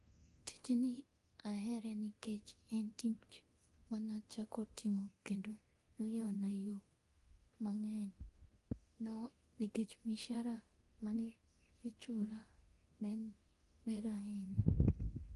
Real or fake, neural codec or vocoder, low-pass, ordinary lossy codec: fake; codec, 24 kHz, 0.9 kbps, DualCodec; 10.8 kHz; Opus, 24 kbps